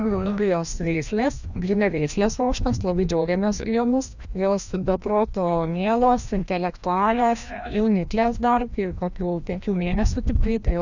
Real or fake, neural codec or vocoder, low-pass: fake; codec, 16 kHz, 1 kbps, FreqCodec, larger model; 7.2 kHz